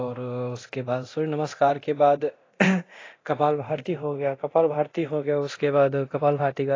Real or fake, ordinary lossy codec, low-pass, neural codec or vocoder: fake; AAC, 32 kbps; 7.2 kHz; codec, 24 kHz, 0.9 kbps, DualCodec